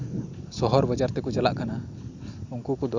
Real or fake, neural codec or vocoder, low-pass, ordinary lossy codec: real; none; 7.2 kHz; Opus, 64 kbps